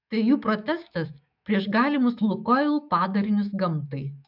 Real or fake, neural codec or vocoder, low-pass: fake; autoencoder, 48 kHz, 128 numbers a frame, DAC-VAE, trained on Japanese speech; 5.4 kHz